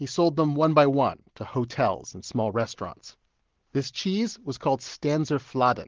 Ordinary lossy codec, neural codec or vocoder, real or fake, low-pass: Opus, 16 kbps; none; real; 7.2 kHz